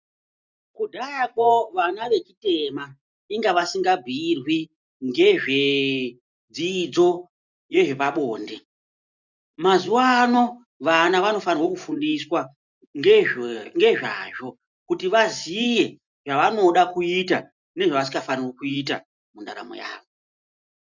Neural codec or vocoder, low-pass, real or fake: none; 7.2 kHz; real